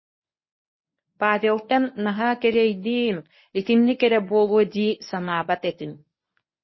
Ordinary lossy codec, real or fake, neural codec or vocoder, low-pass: MP3, 24 kbps; fake; codec, 24 kHz, 0.9 kbps, WavTokenizer, medium speech release version 1; 7.2 kHz